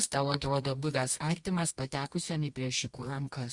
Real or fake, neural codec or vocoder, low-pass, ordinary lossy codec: fake; codec, 24 kHz, 0.9 kbps, WavTokenizer, medium music audio release; 10.8 kHz; Opus, 64 kbps